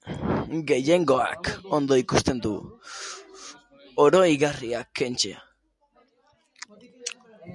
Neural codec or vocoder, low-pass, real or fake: none; 10.8 kHz; real